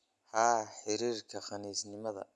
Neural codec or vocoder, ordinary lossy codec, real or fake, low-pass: none; none; real; none